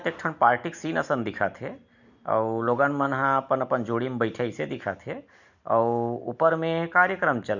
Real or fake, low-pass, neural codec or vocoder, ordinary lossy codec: real; 7.2 kHz; none; none